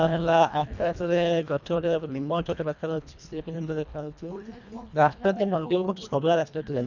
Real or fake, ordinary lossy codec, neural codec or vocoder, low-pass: fake; none; codec, 24 kHz, 1.5 kbps, HILCodec; 7.2 kHz